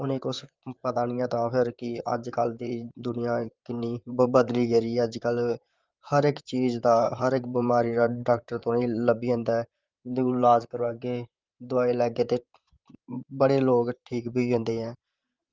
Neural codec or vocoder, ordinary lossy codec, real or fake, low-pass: vocoder, 44.1 kHz, 128 mel bands, Pupu-Vocoder; Opus, 24 kbps; fake; 7.2 kHz